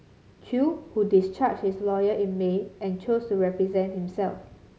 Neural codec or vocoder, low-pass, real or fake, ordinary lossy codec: none; none; real; none